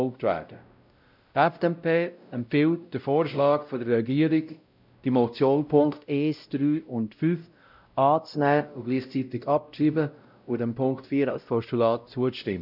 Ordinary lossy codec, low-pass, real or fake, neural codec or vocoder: none; 5.4 kHz; fake; codec, 16 kHz, 0.5 kbps, X-Codec, WavLM features, trained on Multilingual LibriSpeech